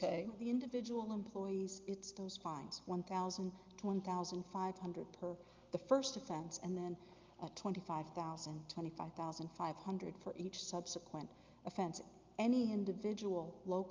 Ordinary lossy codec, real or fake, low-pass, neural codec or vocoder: Opus, 24 kbps; real; 7.2 kHz; none